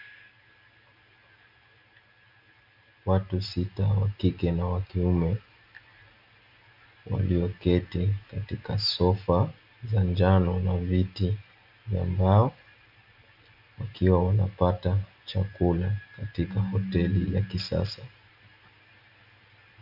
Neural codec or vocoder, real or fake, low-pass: none; real; 5.4 kHz